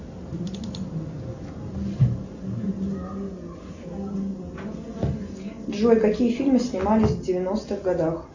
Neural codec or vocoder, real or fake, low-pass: none; real; 7.2 kHz